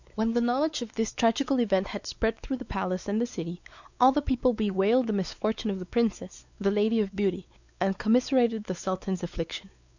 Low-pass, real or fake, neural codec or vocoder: 7.2 kHz; fake; codec, 16 kHz, 4 kbps, X-Codec, WavLM features, trained on Multilingual LibriSpeech